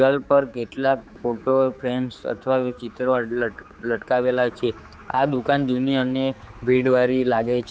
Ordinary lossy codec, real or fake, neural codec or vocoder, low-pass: none; fake; codec, 16 kHz, 4 kbps, X-Codec, HuBERT features, trained on general audio; none